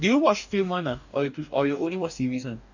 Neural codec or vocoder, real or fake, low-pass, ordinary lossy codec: codec, 44.1 kHz, 2.6 kbps, DAC; fake; 7.2 kHz; none